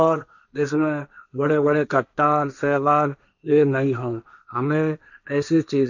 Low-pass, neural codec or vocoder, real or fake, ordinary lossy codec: 7.2 kHz; codec, 16 kHz, 1.1 kbps, Voila-Tokenizer; fake; none